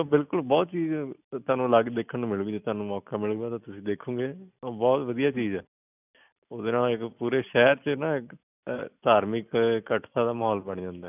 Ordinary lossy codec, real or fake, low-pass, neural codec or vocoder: none; real; 3.6 kHz; none